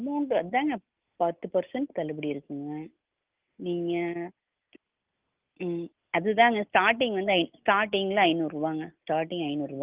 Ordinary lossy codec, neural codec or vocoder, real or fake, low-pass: Opus, 32 kbps; none; real; 3.6 kHz